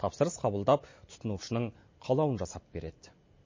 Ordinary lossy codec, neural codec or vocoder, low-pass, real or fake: MP3, 32 kbps; none; 7.2 kHz; real